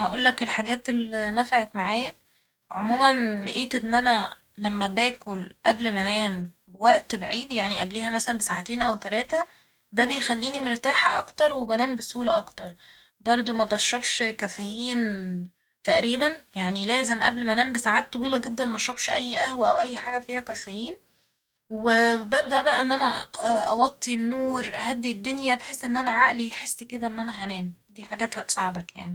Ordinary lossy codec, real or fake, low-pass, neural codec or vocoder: none; fake; 19.8 kHz; codec, 44.1 kHz, 2.6 kbps, DAC